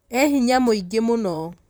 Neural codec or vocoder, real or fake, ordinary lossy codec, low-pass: vocoder, 44.1 kHz, 128 mel bands, Pupu-Vocoder; fake; none; none